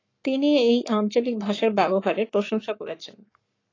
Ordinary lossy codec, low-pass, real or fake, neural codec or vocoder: AAC, 32 kbps; 7.2 kHz; fake; codec, 44.1 kHz, 7.8 kbps, Pupu-Codec